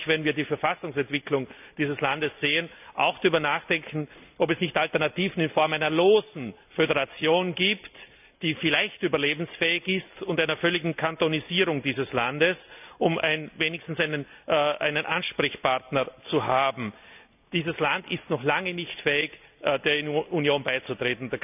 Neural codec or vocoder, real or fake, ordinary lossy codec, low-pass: none; real; none; 3.6 kHz